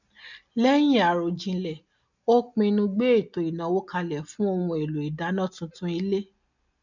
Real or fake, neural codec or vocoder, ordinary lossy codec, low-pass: real; none; none; 7.2 kHz